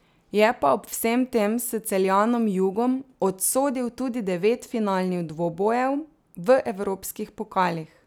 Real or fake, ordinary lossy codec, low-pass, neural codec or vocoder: real; none; none; none